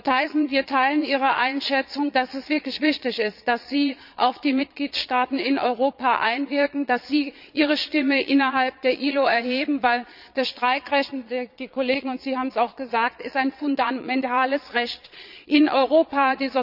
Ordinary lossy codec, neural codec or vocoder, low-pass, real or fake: none; vocoder, 22.05 kHz, 80 mel bands, Vocos; 5.4 kHz; fake